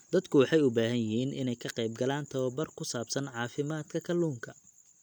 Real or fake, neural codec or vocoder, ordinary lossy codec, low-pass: real; none; none; 19.8 kHz